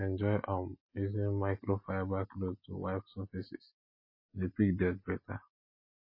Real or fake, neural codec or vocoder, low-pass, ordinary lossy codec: real; none; 5.4 kHz; MP3, 24 kbps